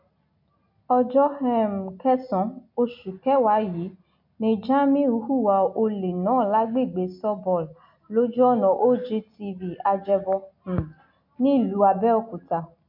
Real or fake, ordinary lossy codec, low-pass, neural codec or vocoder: real; MP3, 48 kbps; 5.4 kHz; none